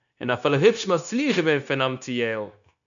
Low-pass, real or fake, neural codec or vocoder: 7.2 kHz; fake; codec, 16 kHz, 0.9 kbps, LongCat-Audio-Codec